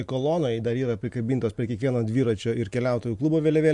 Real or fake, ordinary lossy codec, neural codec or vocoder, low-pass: real; MP3, 64 kbps; none; 10.8 kHz